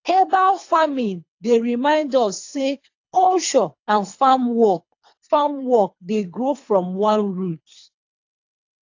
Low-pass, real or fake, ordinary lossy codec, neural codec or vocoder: 7.2 kHz; fake; AAC, 48 kbps; codec, 24 kHz, 3 kbps, HILCodec